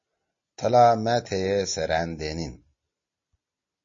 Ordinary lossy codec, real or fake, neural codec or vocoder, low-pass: MP3, 32 kbps; real; none; 7.2 kHz